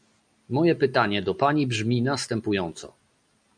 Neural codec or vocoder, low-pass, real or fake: none; 9.9 kHz; real